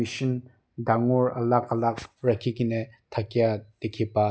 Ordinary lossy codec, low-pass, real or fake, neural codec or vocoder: none; none; real; none